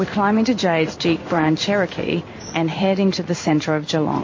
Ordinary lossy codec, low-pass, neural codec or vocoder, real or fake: MP3, 32 kbps; 7.2 kHz; codec, 16 kHz in and 24 kHz out, 1 kbps, XY-Tokenizer; fake